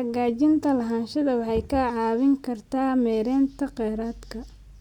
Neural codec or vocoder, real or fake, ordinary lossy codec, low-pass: none; real; none; 19.8 kHz